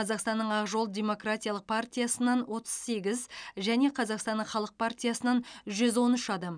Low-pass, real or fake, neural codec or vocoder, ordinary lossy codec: 9.9 kHz; real; none; none